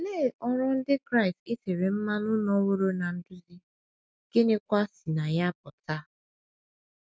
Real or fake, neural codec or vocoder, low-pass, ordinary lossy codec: real; none; none; none